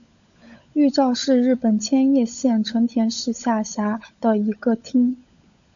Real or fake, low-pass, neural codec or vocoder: fake; 7.2 kHz; codec, 16 kHz, 16 kbps, FunCodec, trained on LibriTTS, 50 frames a second